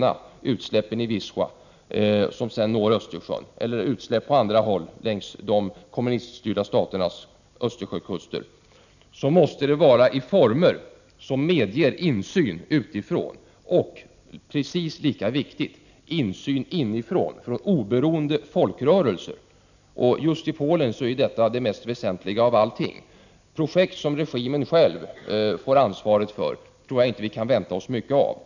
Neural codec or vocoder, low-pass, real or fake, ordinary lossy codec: none; 7.2 kHz; real; none